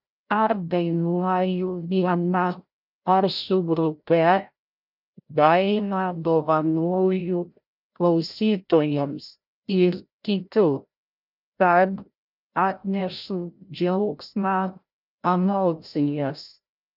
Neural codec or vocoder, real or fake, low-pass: codec, 16 kHz, 0.5 kbps, FreqCodec, larger model; fake; 5.4 kHz